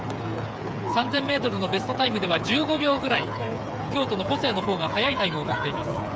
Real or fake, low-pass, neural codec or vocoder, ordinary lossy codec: fake; none; codec, 16 kHz, 8 kbps, FreqCodec, smaller model; none